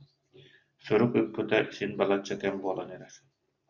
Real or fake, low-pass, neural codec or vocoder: real; 7.2 kHz; none